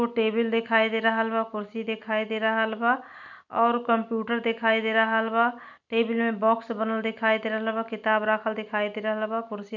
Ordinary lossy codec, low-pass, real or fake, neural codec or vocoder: none; 7.2 kHz; real; none